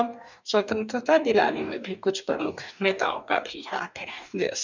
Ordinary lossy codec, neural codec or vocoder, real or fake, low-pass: none; codec, 44.1 kHz, 2.6 kbps, DAC; fake; 7.2 kHz